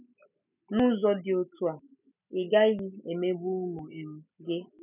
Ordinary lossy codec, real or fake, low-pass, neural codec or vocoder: none; real; 3.6 kHz; none